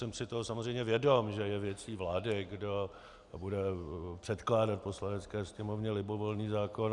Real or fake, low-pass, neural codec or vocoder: real; 10.8 kHz; none